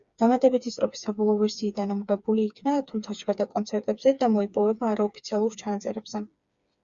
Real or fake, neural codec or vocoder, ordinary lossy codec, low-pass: fake; codec, 16 kHz, 4 kbps, FreqCodec, smaller model; Opus, 64 kbps; 7.2 kHz